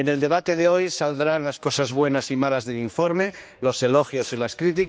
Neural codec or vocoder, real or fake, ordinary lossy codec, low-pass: codec, 16 kHz, 2 kbps, X-Codec, HuBERT features, trained on general audio; fake; none; none